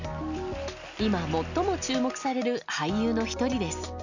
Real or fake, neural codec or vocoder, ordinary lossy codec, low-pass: real; none; none; 7.2 kHz